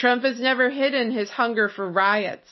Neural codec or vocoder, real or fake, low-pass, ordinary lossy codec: none; real; 7.2 kHz; MP3, 24 kbps